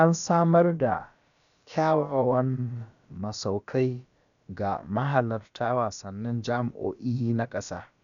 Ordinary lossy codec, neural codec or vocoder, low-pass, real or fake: none; codec, 16 kHz, about 1 kbps, DyCAST, with the encoder's durations; 7.2 kHz; fake